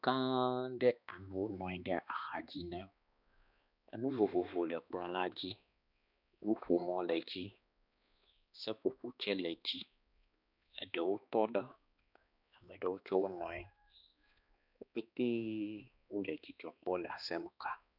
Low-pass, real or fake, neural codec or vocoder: 5.4 kHz; fake; codec, 16 kHz, 2 kbps, X-Codec, HuBERT features, trained on balanced general audio